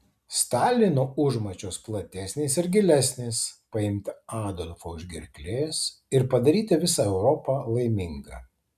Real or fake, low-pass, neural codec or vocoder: real; 14.4 kHz; none